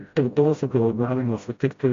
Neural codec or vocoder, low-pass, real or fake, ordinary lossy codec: codec, 16 kHz, 0.5 kbps, FreqCodec, smaller model; 7.2 kHz; fake; MP3, 48 kbps